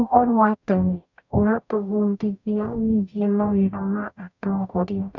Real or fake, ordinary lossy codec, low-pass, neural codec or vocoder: fake; none; 7.2 kHz; codec, 44.1 kHz, 0.9 kbps, DAC